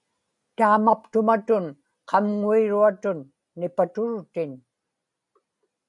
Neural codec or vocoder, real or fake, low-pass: none; real; 10.8 kHz